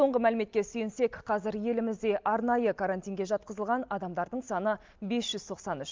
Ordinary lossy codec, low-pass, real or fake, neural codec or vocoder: Opus, 32 kbps; 7.2 kHz; real; none